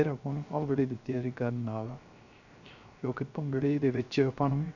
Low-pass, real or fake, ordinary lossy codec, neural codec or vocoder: 7.2 kHz; fake; none; codec, 16 kHz, 0.3 kbps, FocalCodec